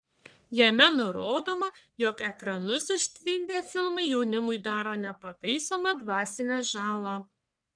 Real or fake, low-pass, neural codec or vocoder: fake; 9.9 kHz; codec, 44.1 kHz, 1.7 kbps, Pupu-Codec